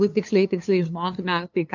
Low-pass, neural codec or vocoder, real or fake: 7.2 kHz; codec, 16 kHz, 2 kbps, FunCodec, trained on LibriTTS, 25 frames a second; fake